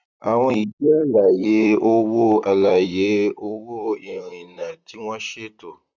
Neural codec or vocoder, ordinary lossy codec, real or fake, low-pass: vocoder, 44.1 kHz, 128 mel bands, Pupu-Vocoder; none; fake; 7.2 kHz